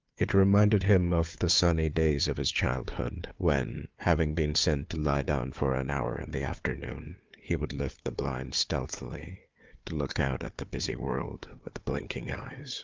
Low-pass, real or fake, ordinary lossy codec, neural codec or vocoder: 7.2 kHz; fake; Opus, 32 kbps; codec, 16 kHz, 4 kbps, FunCodec, trained on Chinese and English, 50 frames a second